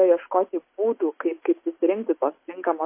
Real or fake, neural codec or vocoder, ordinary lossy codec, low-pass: real; none; MP3, 24 kbps; 3.6 kHz